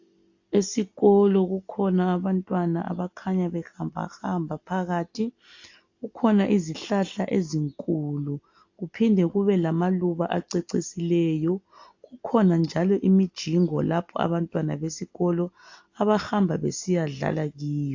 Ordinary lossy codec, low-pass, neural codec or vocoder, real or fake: AAC, 48 kbps; 7.2 kHz; none; real